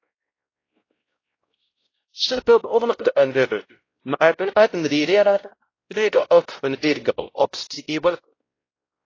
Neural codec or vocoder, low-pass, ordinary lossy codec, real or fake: codec, 16 kHz, 0.5 kbps, X-Codec, WavLM features, trained on Multilingual LibriSpeech; 7.2 kHz; AAC, 32 kbps; fake